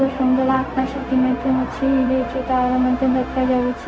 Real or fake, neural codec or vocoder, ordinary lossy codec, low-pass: fake; codec, 16 kHz, 0.4 kbps, LongCat-Audio-Codec; none; none